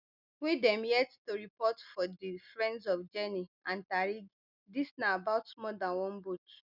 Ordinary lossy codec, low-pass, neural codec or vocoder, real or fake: none; 5.4 kHz; none; real